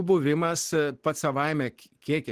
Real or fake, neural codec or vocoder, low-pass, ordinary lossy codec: real; none; 14.4 kHz; Opus, 16 kbps